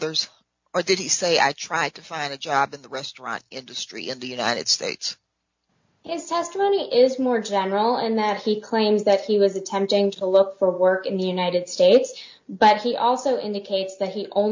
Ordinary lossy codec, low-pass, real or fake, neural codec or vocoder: MP3, 48 kbps; 7.2 kHz; real; none